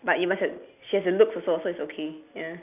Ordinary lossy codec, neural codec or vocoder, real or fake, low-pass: none; none; real; 3.6 kHz